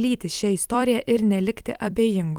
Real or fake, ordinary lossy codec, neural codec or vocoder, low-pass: fake; Opus, 32 kbps; vocoder, 44.1 kHz, 128 mel bands every 256 samples, BigVGAN v2; 19.8 kHz